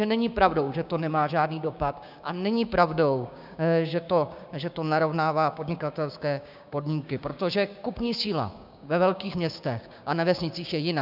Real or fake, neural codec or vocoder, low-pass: fake; codec, 16 kHz, 6 kbps, DAC; 5.4 kHz